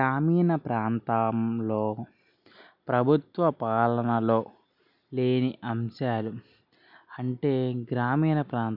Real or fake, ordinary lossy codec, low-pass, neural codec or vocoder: real; none; 5.4 kHz; none